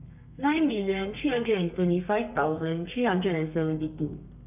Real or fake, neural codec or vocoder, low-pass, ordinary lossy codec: fake; codec, 32 kHz, 1.9 kbps, SNAC; 3.6 kHz; none